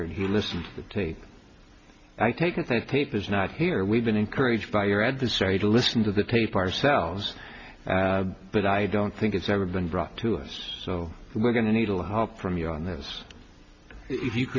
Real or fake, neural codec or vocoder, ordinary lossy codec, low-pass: real; none; Opus, 64 kbps; 7.2 kHz